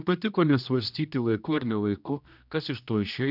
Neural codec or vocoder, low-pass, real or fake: codec, 16 kHz, 1 kbps, X-Codec, HuBERT features, trained on general audio; 5.4 kHz; fake